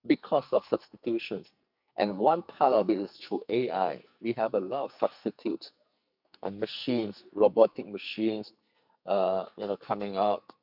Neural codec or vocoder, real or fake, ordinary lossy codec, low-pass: codec, 44.1 kHz, 2.6 kbps, SNAC; fake; none; 5.4 kHz